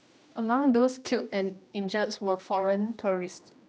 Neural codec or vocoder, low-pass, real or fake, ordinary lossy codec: codec, 16 kHz, 1 kbps, X-Codec, HuBERT features, trained on general audio; none; fake; none